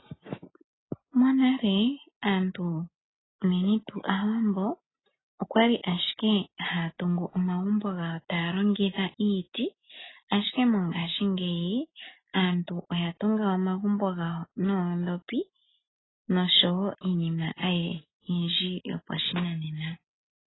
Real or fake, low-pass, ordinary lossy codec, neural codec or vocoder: real; 7.2 kHz; AAC, 16 kbps; none